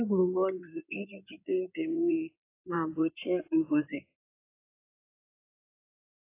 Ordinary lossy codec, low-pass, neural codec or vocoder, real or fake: AAC, 24 kbps; 3.6 kHz; codec, 16 kHz in and 24 kHz out, 2.2 kbps, FireRedTTS-2 codec; fake